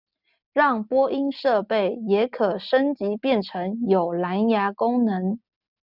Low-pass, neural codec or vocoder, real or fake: 5.4 kHz; none; real